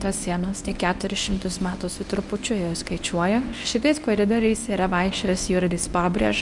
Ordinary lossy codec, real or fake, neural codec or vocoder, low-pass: MP3, 96 kbps; fake; codec, 24 kHz, 0.9 kbps, WavTokenizer, medium speech release version 1; 10.8 kHz